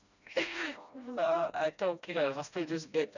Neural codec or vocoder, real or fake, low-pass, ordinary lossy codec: codec, 16 kHz, 1 kbps, FreqCodec, smaller model; fake; 7.2 kHz; none